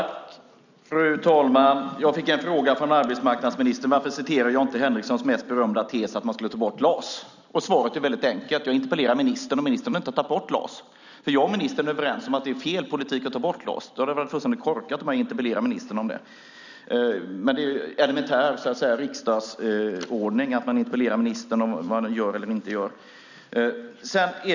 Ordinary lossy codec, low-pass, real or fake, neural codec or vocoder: none; 7.2 kHz; real; none